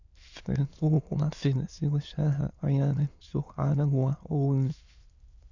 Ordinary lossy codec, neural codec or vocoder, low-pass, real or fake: none; autoencoder, 22.05 kHz, a latent of 192 numbers a frame, VITS, trained on many speakers; 7.2 kHz; fake